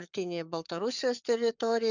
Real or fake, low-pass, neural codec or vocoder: fake; 7.2 kHz; codec, 44.1 kHz, 7.8 kbps, Pupu-Codec